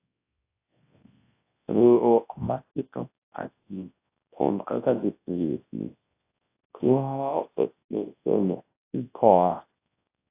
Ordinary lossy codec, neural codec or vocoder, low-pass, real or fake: AAC, 24 kbps; codec, 24 kHz, 0.9 kbps, WavTokenizer, large speech release; 3.6 kHz; fake